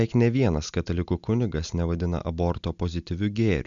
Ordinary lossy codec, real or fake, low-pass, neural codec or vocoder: MP3, 96 kbps; real; 7.2 kHz; none